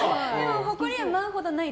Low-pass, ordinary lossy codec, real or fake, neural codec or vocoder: none; none; real; none